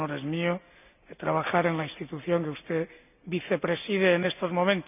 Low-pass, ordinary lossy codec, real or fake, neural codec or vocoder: 3.6 kHz; none; real; none